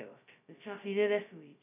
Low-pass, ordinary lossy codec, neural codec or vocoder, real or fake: 3.6 kHz; none; codec, 16 kHz, 0.2 kbps, FocalCodec; fake